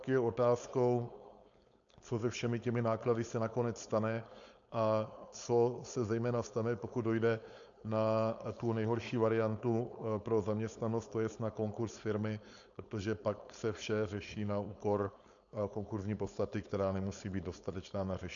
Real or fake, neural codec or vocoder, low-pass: fake; codec, 16 kHz, 4.8 kbps, FACodec; 7.2 kHz